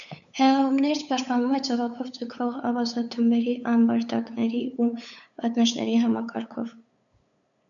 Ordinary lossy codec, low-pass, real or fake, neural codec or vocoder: MP3, 96 kbps; 7.2 kHz; fake; codec, 16 kHz, 8 kbps, FunCodec, trained on LibriTTS, 25 frames a second